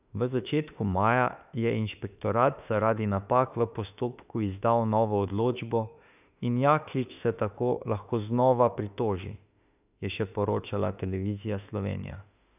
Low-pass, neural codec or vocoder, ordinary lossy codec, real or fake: 3.6 kHz; autoencoder, 48 kHz, 32 numbers a frame, DAC-VAE, trained on Japanese speech; none; fake